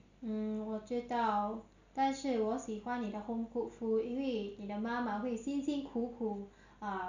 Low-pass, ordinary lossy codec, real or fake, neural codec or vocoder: 7.2 kHz; none; real; none